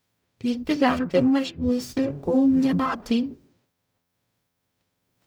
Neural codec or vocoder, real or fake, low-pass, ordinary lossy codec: codec, 44.1 kHz, 0.9 kbps, DAC; fake; none; none